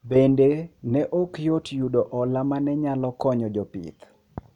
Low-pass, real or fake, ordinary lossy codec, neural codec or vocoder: 19.8 kHz; real; none; none